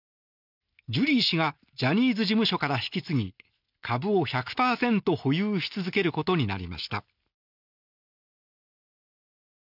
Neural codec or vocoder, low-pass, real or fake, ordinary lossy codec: none; 5.4 kHz; real; none